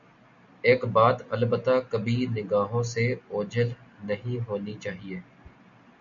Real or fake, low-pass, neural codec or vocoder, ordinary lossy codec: real; 7.2 kHz; none; MP3, 96 kbps